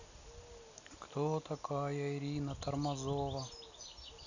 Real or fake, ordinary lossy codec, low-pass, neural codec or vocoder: real; none; 7.2 kHz; none